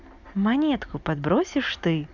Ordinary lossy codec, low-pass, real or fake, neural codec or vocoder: none; 7.2 kHz; real; none